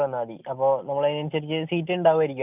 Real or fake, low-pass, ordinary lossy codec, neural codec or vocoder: real; 3.6 kHz; none; none